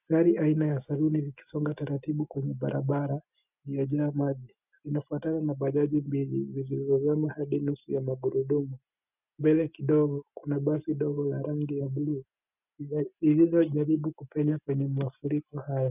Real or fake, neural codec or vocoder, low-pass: real; none; 3.6 kHz